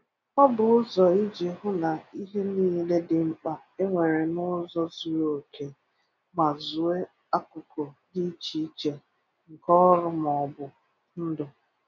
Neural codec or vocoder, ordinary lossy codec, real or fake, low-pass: none; none; real; 7.2 kHz